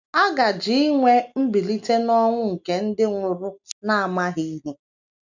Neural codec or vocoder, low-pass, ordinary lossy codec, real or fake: none; 7.2 kHz; none; real